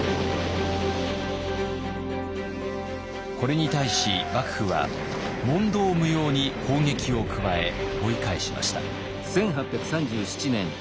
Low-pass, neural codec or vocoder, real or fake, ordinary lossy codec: none; none; real; none